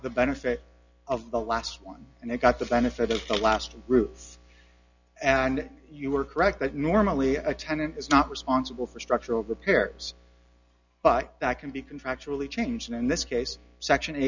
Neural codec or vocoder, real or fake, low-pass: none; real; 7.2 kHz